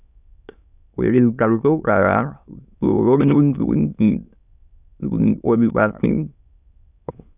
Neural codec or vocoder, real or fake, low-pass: autoencoder, 22.05 kHz, a latent of 192 numbers a frame, VITS, trained on many speakers; fake; 3.6 kHz